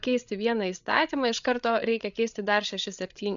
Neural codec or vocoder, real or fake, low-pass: codec, 16 kHz, 8 kbps, FreqCodec, larger model; fake; 7.2 kHz